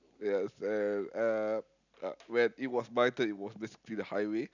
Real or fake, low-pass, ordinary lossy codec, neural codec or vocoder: real; 7.2 kHz; none; none